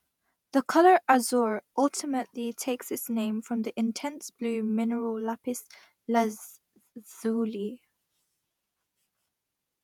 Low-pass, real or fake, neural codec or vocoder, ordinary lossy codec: 19.8 kHz; fake; vocoder, 48 kHz, 128 mel bands, Vocos; none